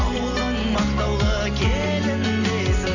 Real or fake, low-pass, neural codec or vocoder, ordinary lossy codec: real; 7.2 kHz; none; none